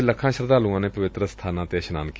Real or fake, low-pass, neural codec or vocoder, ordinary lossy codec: real; none; none; none